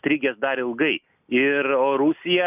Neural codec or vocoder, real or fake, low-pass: none; real; 3.6 kHz